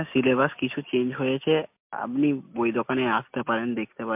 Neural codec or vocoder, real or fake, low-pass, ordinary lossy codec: none; real; 3.6 kHz; MP3, 32 kbps